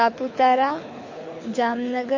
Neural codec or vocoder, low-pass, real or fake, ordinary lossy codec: codec, 24 kHz, 6 kbps, HILCodec; 7.2 kHz; fake; MP3, 32 kbps